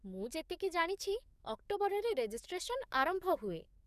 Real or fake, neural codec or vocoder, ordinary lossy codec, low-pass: fake; codec, 44.1 kHz, 7.8 kbps, DAC; none; 14.4 kHz